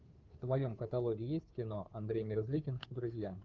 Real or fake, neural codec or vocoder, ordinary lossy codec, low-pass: fake; codec, 16 kHz, 2 kbps, FunCodec, trained on Chinese and English, 25 frames a second; Opus, 32 kbps; 7.2 kHz